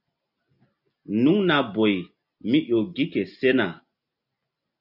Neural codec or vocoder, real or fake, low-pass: none; real; 5.4 kHz